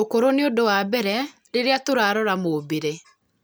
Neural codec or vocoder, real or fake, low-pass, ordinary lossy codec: none; real; none; none